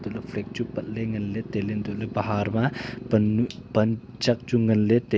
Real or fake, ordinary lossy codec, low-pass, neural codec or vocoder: real; none; none; none